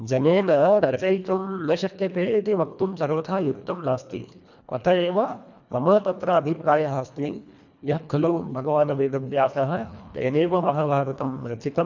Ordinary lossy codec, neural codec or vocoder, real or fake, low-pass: none; codec, 24 kHz, 1.5 kbps, HILCodec; fake; 7.2 kHz